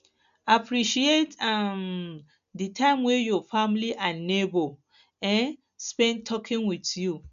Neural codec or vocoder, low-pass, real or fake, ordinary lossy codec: none; 7.2 kHz; real; AAC, 96 kbps